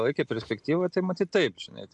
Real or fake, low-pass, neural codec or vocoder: real; 10.8 kHz; none